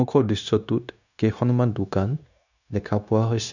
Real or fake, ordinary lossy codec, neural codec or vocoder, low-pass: fake; none; codec, 16 kHz, 0.9 kbps, LongCat-Audio-Codec; 7.2 kHz